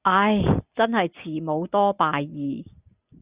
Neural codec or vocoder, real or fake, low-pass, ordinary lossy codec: none; real; 3.6 kHz; Opus, 64 kbps